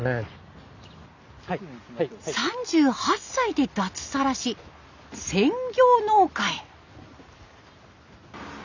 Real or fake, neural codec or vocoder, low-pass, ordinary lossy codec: real; none; 7.2 kHz; none